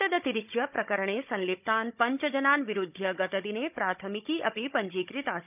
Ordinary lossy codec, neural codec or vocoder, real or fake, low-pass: none; codec, 16 kHz, 16 kbps, FunCodec, trained on Chinese and English, 50 frames a second; fake; 3.6 kHz